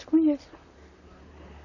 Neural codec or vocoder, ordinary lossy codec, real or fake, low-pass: vocoder, 44.1 kHz, 128 mel bands, Pupu-Vocoder; none; fake; 7.2 kHz